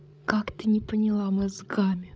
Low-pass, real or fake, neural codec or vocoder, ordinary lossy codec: none; fake; codec, 16 kHz, 8 kbps, FreqCodec, larger model; none